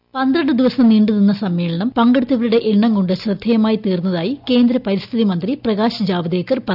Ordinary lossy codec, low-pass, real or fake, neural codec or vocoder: none; 5.4 kHz; real; none